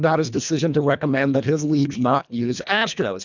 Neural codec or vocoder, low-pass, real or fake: codec, 24 kHz, 1.5 kbps, HILCodec; 7.2 kHz; fake